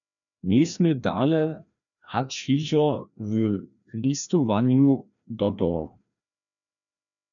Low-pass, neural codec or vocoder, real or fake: 7.2 kHz; codec, 16 kHz, 1 kbps, FreqCodec, larger model; fake